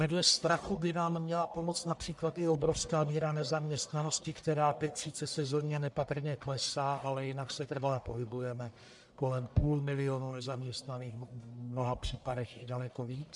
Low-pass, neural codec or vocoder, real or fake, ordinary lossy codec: 10.8 kHz; codec, 44.1 kHz, 1.7 kbps, Pupu-Codec; fake; MP3, 96 kbps